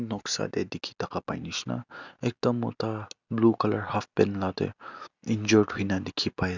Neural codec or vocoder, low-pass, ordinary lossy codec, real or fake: none; 7.2 kHz; none; real